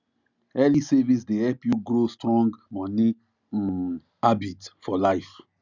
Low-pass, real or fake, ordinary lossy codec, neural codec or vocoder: 7.2 kHz; real; none; none